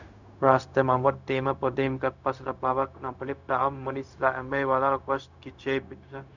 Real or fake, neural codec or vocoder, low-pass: fake; codec, 16 kHz, 0.4 kbps, LongCat-Audio-Codec; 7.2 kHz